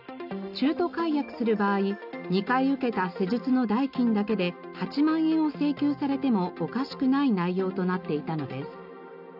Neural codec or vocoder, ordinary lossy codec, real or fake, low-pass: none; none; real; 5.4 kHz